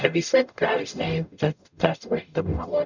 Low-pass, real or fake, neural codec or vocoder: 7.2 kHz; fake; codec, 44.1 kHz, 0.9 kbps, DAC